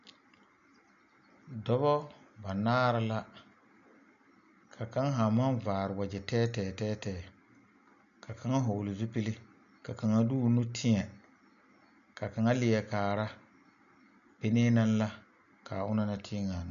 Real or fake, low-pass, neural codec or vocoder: real; 7.2 kHz; none